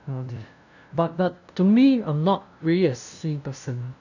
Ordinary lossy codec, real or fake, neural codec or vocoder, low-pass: none; fake; codec, 16 kHz, 0.5 kbps, FunCodec, trained on LibriTTS, 25 frames a second; 7.2 kHz